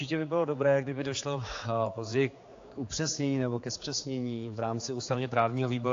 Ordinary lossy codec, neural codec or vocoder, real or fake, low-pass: AAC, 48 kbps; codec, 16 kHz, 4 kbps, X-Codec, HuBERT features, trained on general audio; fake; 7.2 kHz